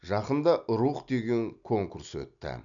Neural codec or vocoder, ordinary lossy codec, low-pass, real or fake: none; none; 7.2 kHz; real